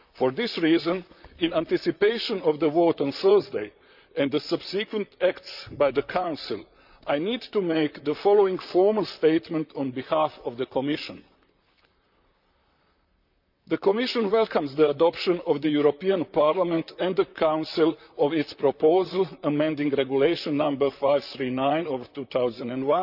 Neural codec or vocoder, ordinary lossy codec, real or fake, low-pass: vocoder, 44.1 kHz, 128 mel bands, Pupu-Vocoder; none; fake; 5.4 kHz